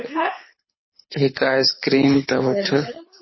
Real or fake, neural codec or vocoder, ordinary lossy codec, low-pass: fake; codec, 24 kHz, 6 kbps, HILCodec; MP3, 24 kbps; 7.2 kHz